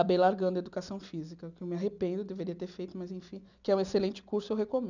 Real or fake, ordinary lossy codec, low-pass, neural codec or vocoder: real; none; 7.2 kHz; none